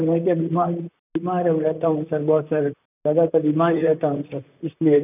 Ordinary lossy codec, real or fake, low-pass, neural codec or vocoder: none; fake; 3.6 kHz; vocoder, 44.1 kHz, 128 mel bands, Pupu-Vocoder